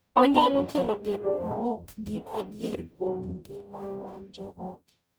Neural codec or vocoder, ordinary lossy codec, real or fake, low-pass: codec, 44.1 kHz, 0.9 kbps, DAC; none; fake; none